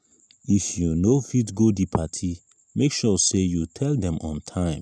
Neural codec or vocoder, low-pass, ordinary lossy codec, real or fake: none; none; none; real